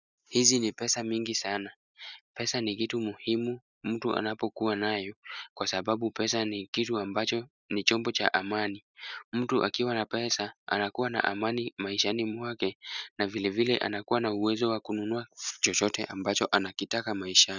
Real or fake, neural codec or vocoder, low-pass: real; none; 7.2 kHz